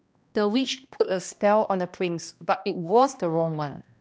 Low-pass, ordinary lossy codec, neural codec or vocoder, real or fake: none; none; codec, 16 kHz, 1 kbps, X-Codec, HuBERT features, trained on balanced general audio; fake